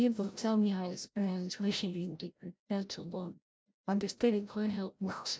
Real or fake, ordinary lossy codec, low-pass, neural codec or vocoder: fake; none; none; codec, 16 kHz, 0.5 kbps, FreqCodec, larger model